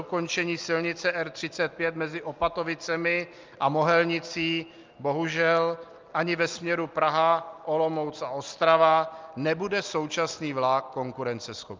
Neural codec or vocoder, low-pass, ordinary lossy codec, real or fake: none; 7.2 kHz; Opus, 24 kbps; real